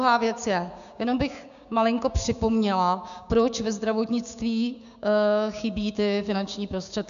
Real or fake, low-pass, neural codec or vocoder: fake; 7.2 kHz; codec, 16 kHz, 6 kbps, DAC